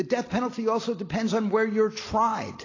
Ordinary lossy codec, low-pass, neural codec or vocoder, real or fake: AAC, 32 kbps; 7.2 kHz; none; real